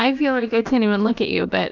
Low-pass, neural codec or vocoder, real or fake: 7.2 kHz; codec, 16 kHz, about 1 kbps, DyCAST, with the encoder's durations; fake